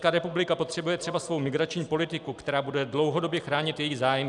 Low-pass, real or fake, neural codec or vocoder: 10.8 kHz; real; none